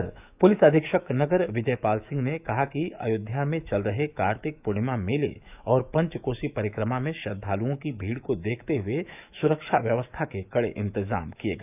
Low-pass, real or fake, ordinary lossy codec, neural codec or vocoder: 3.6 kHz; fake; none; autoencoder, 48 kHz, 128 numbers a frame, DAC-VAE, trained on Japanese speech